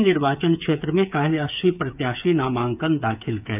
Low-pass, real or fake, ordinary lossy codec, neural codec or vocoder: 3.6 kHz; fake; none; codec, 16 kHz, 8 kbps, FreqCodec, smaller model